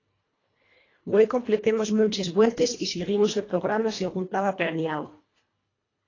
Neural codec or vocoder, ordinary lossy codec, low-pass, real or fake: codec, 24 kHz, 1.5 kbps, HILCodec; AAC, 32 kbps; 7.2 kHz; fake